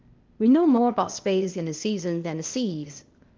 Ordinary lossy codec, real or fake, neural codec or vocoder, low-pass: Opus, 24 kbps; fake; codec, 16 kHz, 0.8 kbps, ZipCodec; 7.2 kHz